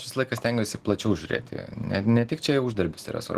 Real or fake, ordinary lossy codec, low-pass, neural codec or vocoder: real; Opus, 16 kbps; 14.4 kHz; none